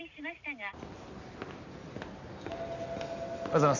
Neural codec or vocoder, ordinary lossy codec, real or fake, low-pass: none; none; real; 7.2 kHz